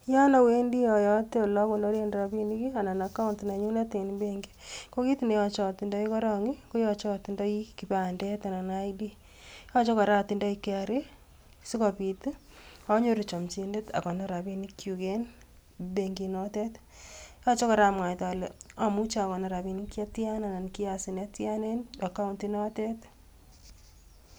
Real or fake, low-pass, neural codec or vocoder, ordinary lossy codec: real; none; none; none